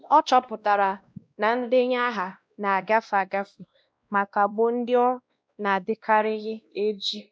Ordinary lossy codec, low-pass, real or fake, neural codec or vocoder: none; none; fake; codec, 16 kHz, 1 kbps, X-Codec, WavLM features, trained on Multilingual LibriSpeech